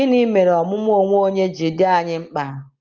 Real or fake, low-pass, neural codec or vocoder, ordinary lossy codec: real; 7.2 kHz; none; Opus, 24 kbps